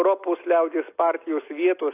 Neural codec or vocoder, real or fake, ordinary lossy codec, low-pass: none; real; AAC, 24 kbps; 3.6 kHz